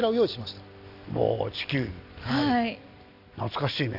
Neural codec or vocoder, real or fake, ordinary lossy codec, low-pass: none; real; none; 5.4 kHz